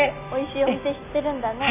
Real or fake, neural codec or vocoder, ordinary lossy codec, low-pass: real; none; none; 3.6 kHz